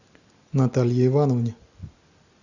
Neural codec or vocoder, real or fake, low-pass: none; real; 7.2 kHz